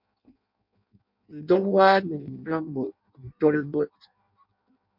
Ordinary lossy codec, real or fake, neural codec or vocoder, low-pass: MP3, 48 kbps; fake; codec, 16 kHz in and 24 kHz out, 0.6 kbps, FireRedTTS-2 codec; 5.4 kHz